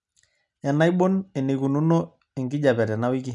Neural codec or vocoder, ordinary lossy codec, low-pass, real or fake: none; none; 10.8 kHz; real